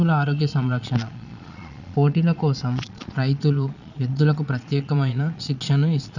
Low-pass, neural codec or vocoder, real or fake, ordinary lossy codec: 7.2 kHz; vocoder, 22.05 kHz, 80 mel bands, Vocos; fake; none